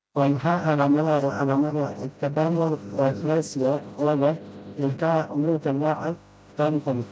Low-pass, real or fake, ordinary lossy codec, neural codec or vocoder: none; fake; none; codec, 16 kHz, 0.5 kbps, FreqCodec, smaller model